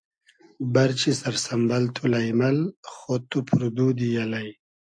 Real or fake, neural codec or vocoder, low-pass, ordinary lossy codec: real; none; 9.9 kHz; AAC, 64 kbps